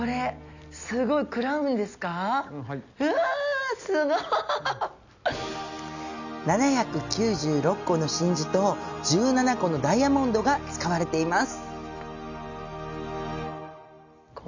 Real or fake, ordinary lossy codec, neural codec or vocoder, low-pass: real; none; none; 7.2 kHz